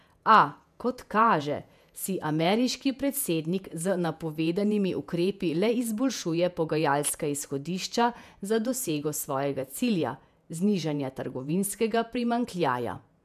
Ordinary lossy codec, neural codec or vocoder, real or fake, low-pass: none; vocoder, 48 kHz, 128 mel bands, Vocos; fake; 14.4 kHz